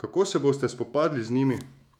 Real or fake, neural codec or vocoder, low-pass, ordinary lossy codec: fake; autoencoder, 48 kHz, 128 numbers a frame, DAC-VAE, trained on Japanese speech; 19.8 kHz; none